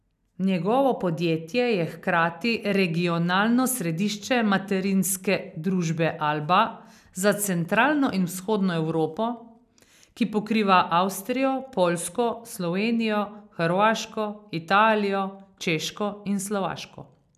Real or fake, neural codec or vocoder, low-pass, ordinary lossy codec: real; none; 14.4 kHz; none